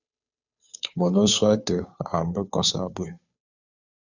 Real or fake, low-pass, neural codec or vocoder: fake; 7.2 kHz; codec, 16 kHz, 2 kbps, FunCodec, trained on Chinese and English, 25 frames a second